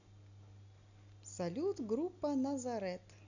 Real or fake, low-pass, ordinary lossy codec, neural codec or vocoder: real; 7.2 kHz; none; none